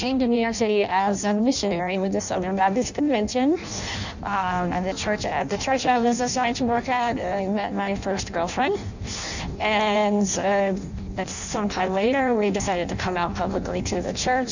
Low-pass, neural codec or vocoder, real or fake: 7.2 kHz; codec, 16 kHz in and 24 kHz out, 0.6 kbps, FireRedTTS-2 codec; fake